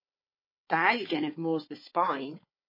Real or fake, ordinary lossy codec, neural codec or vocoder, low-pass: fake; MP3, 24 kbps; codec, 16 kHz, 4 kbps, FunCodec, trained on Chinese and English, 50 frames a second; 5.4 kHz